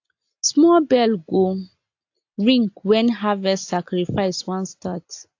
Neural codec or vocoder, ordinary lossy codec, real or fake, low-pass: none; AAC, 48 kbps; real; 7.2 kHz